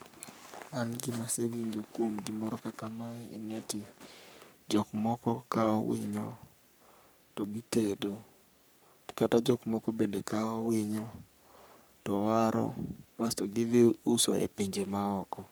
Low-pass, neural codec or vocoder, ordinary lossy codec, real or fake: none; codec, 44.1 kHz, 3.4 kbps, Pupu-Codec; none; fake